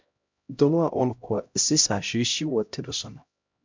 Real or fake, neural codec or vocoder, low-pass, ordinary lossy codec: fake; codec, 16 kHz, 0.5 kbps, X-Codec, HuBERT features, trained on LibriSpeech; 7.2 kHz; MP3, 64 kbps